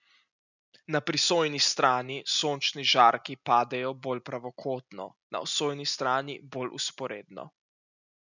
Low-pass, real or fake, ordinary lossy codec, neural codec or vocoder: 7.2 kHz; real; none; none